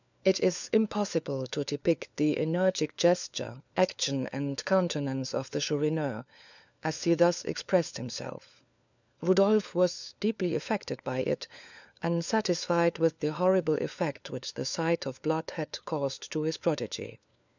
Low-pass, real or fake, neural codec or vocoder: 7.2 kHz; fake; codec, 16 kHz, 4 kbps, FunCodec, trained on LibriTTS, 50 frames a second